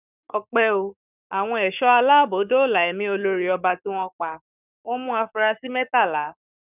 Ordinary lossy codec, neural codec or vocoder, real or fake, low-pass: none; codec, 44.1 kHz, 7.8 kbps, Pupu-Codec; fake; 3.6 kHz